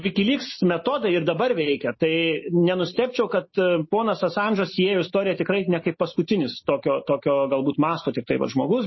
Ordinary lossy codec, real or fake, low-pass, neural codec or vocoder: MP3, 24 kbps; real; 7.2 kHz; none